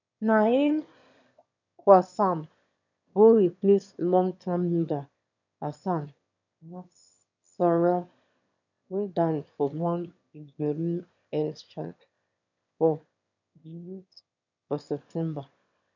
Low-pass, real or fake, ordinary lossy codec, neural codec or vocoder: 7.2 kHz; fake; none; autoencoder, 22.05 kHz, a latent of 192 numbers a frame, VITS, trained on one speaker